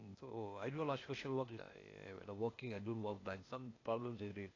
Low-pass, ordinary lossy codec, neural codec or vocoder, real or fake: 7.2 kHz; AAC, 32 kbps; codec, 16 kHz, 0.8 kbps, ZipCodec; fake